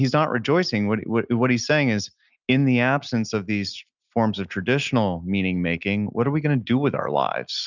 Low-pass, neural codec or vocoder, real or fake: 7.2 kHz; none; real